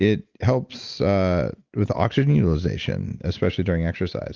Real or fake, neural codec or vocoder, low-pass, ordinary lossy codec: real; none; 7.2 kHz; Opus, 32 kbps